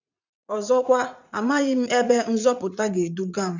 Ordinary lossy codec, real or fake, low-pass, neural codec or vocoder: none; fake; 7.2 kHz; vocoder, 44.1 kHz, 80 mel bands, Vocos